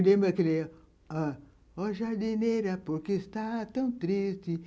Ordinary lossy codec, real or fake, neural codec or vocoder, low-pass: none; real; none; none